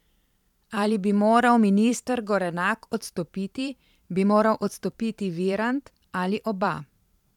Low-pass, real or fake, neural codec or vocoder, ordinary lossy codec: 19.8 kHz; fake; vocoder, 44.1 kHz, 128 mel bands every 512 samples, BigVGAN v2; none